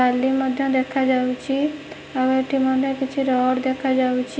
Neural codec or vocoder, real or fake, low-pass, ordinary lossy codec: none; real; none; none